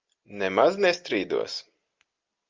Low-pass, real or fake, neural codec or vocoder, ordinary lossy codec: 7.2 kHz; real; none; Opus, 24 kbps